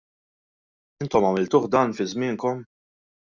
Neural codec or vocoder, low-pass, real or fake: none; 7.2 kHz; real